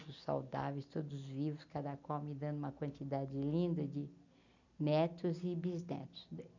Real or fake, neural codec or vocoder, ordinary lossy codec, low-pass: real; none; none; 7.2 kHz